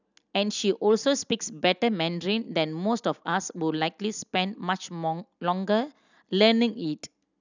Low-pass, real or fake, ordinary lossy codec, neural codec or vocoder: 7.2 kHz; real; none; none